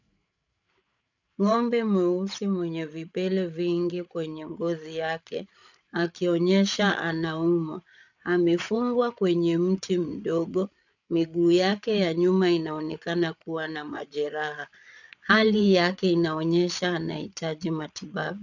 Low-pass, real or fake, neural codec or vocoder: 7.2 kHz; fake; codec, 16 kHz, 8 kbps, FreqCodec, larger model